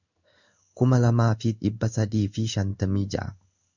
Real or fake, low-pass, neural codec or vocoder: fake; 7.2 kHz; codec, 16 kHz in and 24 kHz out, 1 kbps, XY-Tokenizer